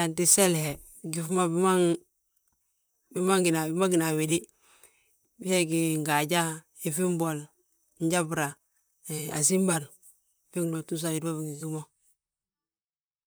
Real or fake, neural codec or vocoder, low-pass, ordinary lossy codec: fake; vocoder, 44.1 kHz, 128 mel bands, Pupu-Vocoder; none; none